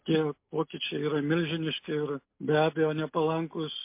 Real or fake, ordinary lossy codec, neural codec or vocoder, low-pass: real; MP3, 24 kbps; none; 3.6 kHz